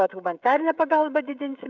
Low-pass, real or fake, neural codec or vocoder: 7.2 kHz; fake; codec, 16 kHz, 16 kbps, FreqCodec, smaller model